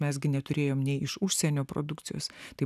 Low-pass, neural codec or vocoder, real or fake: 14.4 kHz; none; real